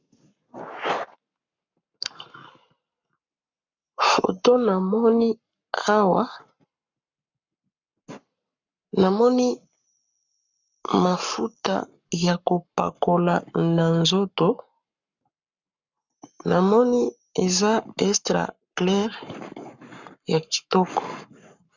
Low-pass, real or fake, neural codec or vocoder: 7.2 kHz; fake; codec, 44.1 kHz, 7.8 kbps, Pupu-Codec